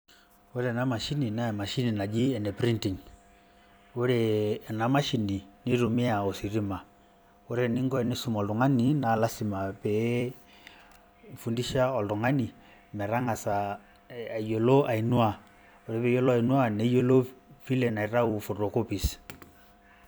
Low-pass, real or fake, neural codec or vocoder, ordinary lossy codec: none; fake; vocoder, 44.1 kHz, 128 mel bands every 256 samples, BigVGAN v2; none